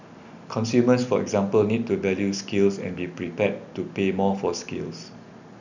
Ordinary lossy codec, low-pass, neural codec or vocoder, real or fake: none; 7.2 kHz; none; real